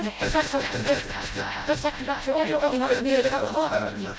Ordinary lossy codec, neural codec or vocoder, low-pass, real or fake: none; codec, 16 kHz, 0.5 kbps, FreqCodec, smaller model; none; fake